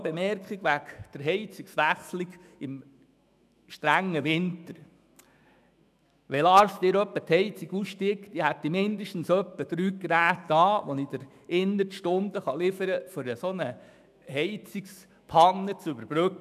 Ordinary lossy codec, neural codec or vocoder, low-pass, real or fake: none; autoencoder, 48 kHz, 128 numbers a frame, DAC-VAE, trained on Japanese speech; 14.4 kHz; fake